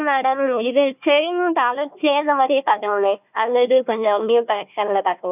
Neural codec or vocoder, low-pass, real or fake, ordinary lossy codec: codec, 16 kHz, 1 kbps, FunCodec, trained on Chinese and English, 50 frames a second; 3.6 kHz; fake; none